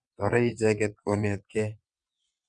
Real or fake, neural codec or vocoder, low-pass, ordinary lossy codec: fake; vocoder, 22.05 kHz, 80 mel bands, WaveNeXt; 9.9 kHz; none